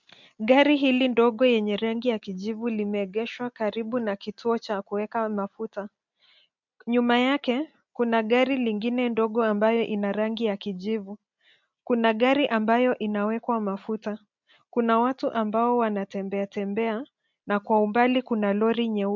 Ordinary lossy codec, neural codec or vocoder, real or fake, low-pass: MP3, 64 kbps; none; real; 7.2 kHz